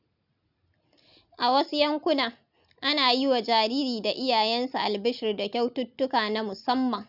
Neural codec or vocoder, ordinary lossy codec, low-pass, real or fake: none; none; 5.4 kHz; real